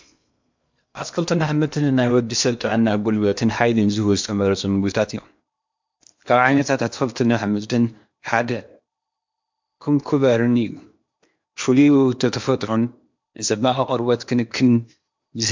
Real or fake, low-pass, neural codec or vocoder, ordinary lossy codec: fake; 7.2 kHz; codec, 16 kHz in and 24 kHz out, 0.8 kbps, FocalCodec, streaming, 65536 codes; MP3, 64 kbps